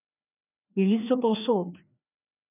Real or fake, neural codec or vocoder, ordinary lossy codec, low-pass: fake; codec, 16 kHz, 2 kbps, FreqCodec, larger model; none; 3.6 kHz